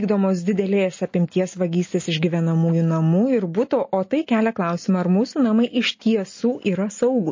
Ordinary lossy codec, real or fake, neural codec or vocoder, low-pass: MP3, 32 kbps; real; none; 7.2 kHz